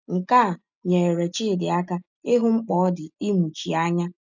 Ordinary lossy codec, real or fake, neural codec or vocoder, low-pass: none; real; none; 7.2 kHz